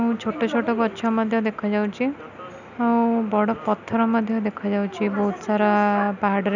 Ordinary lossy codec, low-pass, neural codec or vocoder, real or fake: none; 7.2 kHz; none; real